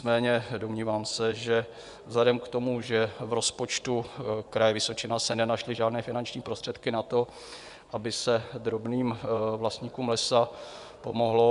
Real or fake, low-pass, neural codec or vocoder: fake; 10.8 kHz; vocoder, 24 kHz, 100 mel bands, Vocos